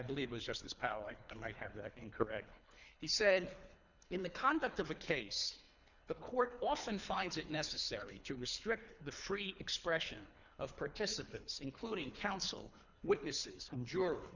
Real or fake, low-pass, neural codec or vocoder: fake; 7.2 kHz; codec, 24 kHz, 3 kbps, HILCodec